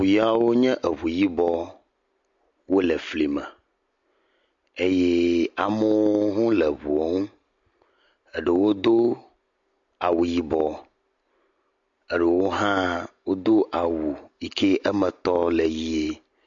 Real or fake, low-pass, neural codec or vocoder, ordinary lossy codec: real; 7.2 kHz; none; MP3, 48 kbps